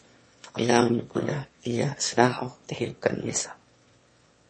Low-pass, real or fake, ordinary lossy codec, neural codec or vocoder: 9.9 kHz; fake; MP3, 32 kbps; autoencoder, 22.05 kHz, a latent of 192 numbers a frame, VITS, trained on one speaker